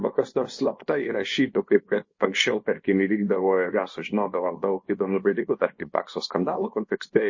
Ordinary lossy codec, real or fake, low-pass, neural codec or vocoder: MP3, 32 kbps; fake; 7.2 kHz; codec, 24 kHz, 0.9 kbps, WavTokenizer, small release